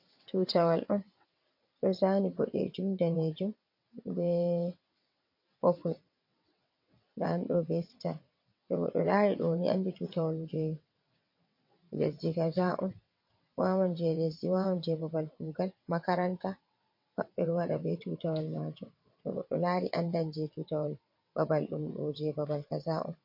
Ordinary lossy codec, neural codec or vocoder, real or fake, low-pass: MP3, 32 kbps; vocoder, 22.05 kHz, 80 mel bands, WaveNeXt; fake; 5.4 kHz